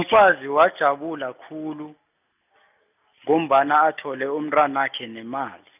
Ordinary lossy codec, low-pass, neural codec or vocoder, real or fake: none; 3.6 kHz; none; real